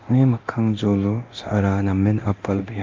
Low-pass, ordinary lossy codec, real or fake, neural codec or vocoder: 7.2 kHz; Opus, 32 kbps; fake; codec, 24 kHz, 0.9 kbps, DualCodec